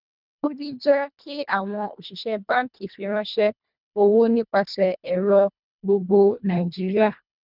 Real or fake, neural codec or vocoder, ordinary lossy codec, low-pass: fake; codec, 24 kHz, 1.5 kbps, HILCodec; none; 5.4 kHz